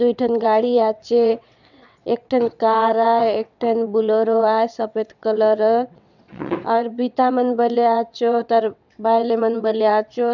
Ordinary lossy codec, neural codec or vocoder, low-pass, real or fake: none; vocoder, 22.05 kHz, 80 mel bands, WaveNeXt; 7.2 kHz; fake